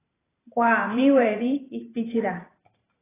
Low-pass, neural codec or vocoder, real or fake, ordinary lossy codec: 3.6 kHz; vocoder, 44.1 kHz, 128 mel bands every 512 samples, BigVGAN v2; fake; AAC, 16 kbps